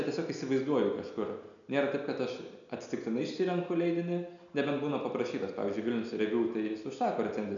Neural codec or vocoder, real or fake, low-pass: none; real; 7.2 kHz